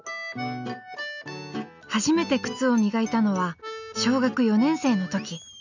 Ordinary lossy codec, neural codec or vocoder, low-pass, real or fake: none; none; 7.2 kHz; real